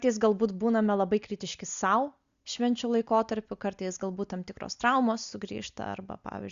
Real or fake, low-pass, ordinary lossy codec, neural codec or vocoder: real; 7.2 kHz; Opus, 64 kbps; none